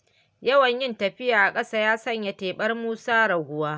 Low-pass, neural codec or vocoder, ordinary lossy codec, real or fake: none; none; none; real